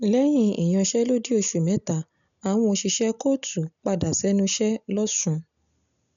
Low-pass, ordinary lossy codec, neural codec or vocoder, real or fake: 7.2 kHz; none; none; real